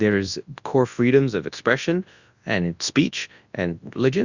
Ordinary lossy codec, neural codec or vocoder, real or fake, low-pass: Opus, 64 kbps; codec, 24 kHz, 0.9 kbps, WavTokenizer, large speech release; fake; 7.2 kHz